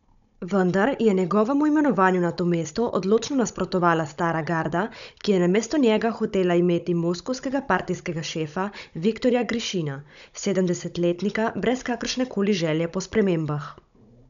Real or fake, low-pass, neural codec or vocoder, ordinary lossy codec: fake; 7.2 kHz; codec, 16 kHz, 16 kbps, FunCodec, trained on Chinese and English, 50 frames a second; none